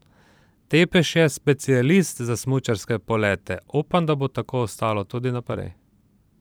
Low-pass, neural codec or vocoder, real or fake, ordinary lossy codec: none; vocoder, 44.1 kHz, 128 mel bands every 512 samples, BigVGAN v2; fake; none